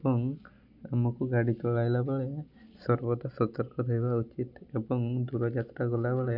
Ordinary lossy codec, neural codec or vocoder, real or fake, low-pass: none; none; real; 5.4 kHz